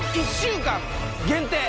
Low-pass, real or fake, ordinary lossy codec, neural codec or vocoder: none; real; none; none